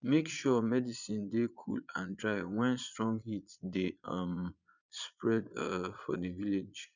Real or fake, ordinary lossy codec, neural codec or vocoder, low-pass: fake; none; vocoder, 22.05 kHz, 80 mel bands, Vocos; 7.2 kHz